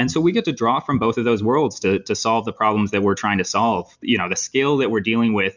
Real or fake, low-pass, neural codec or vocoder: real; 7.2 kHz; none